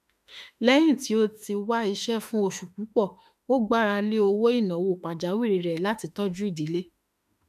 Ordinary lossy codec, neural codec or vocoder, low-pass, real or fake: none; autoencoder, 48 kHz, 32 numbers a frame, DAC-VAE, trained on Japanese speech; 14.4 kHz; fake